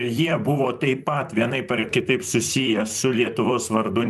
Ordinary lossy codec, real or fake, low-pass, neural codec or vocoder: MP3, 96 kbps; fake; 14.4 kHz; vocoder, 44.1 kHz, 128 mel bands, Pupu-Vocoder